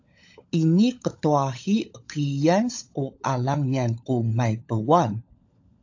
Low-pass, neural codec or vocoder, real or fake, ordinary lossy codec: 7.2 kHz; codec, 16 kHz, 16 kbps, FunCodec, trained on LibriTTS, 50 frames a second; fake; AAC, 48 kbps